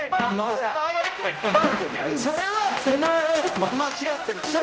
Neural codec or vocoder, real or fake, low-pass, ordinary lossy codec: codec, 16 kHz, 0.5 kbps, X-Codec, HuBERT features, trained on general audio; fake; none; none